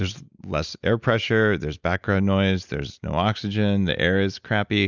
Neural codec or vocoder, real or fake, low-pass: none; real; 7.2 kHz